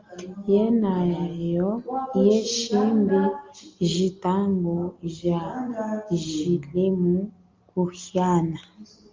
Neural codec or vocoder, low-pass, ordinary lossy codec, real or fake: none; 7.2 kHz; Opus, 32 kbps; real